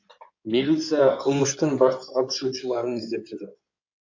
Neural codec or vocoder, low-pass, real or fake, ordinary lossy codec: codec, 16 kHz in and 24 kHz out, 2.2 kbps, FireRedTTS-2 codec; 7.2 kHz; fake; AAC, 48 kbps